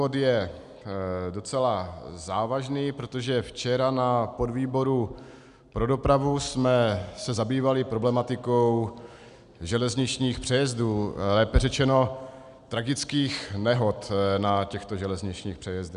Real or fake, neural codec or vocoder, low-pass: real; none; 10.8 kHz